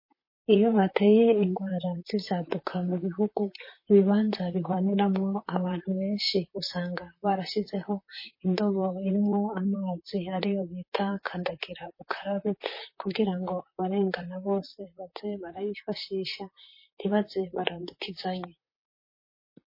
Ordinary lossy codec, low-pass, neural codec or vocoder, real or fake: MP3, 24 kbps; 5.4 kHz; vocoder, 44.1 kHz, 128 mel bands, Pupu-Vocoder; fake